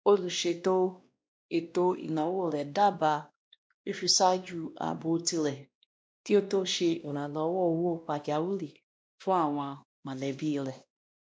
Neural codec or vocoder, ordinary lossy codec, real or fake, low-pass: codec, 16 kHz, 1 kbps, X-Codec, WavLM features, trained on Multilingual LibriSpeech; none; fake; none